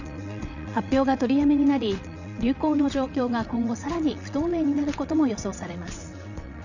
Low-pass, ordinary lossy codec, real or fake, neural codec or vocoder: 7.2 kHz; none; fake; vocoder, 22.05 kHz, 80 mel bands, WaveNeXt